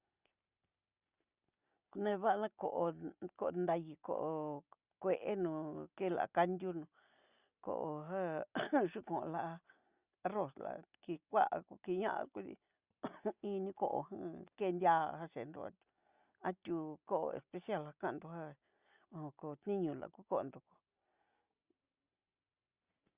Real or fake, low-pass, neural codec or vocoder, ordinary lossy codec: real; 3.6 kHz; none; Opus, 64 kbps